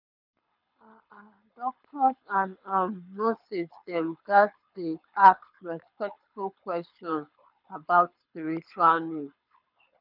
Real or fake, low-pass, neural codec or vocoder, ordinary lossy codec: fake; 5.4 kHz; codec, 24 kHz, 6 kbps, HILCodec; none